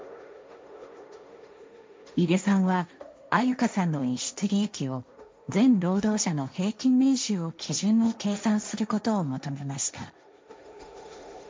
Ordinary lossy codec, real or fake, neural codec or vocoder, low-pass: none; fake; codec, 16 kHz, 1.1 kbps, Voila-Tokenizer; none